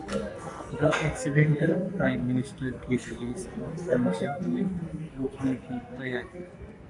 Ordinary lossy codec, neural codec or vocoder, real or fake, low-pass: AAC, 64 kbps; codec, 44.1 kHz, 2.6 kbps, SNAC; fake; 10.8 kHz